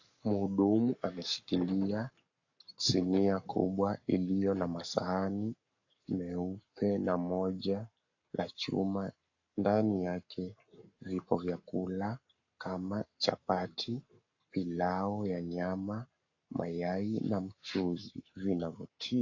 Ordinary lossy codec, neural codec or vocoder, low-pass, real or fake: AAC, 48 kbps; codec, 44.1 kHz, 7.8 kbps, Pupu-Codec; 7.2 kHz; fake